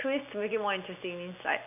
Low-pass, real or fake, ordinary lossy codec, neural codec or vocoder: 3.6 kHz; real; none; none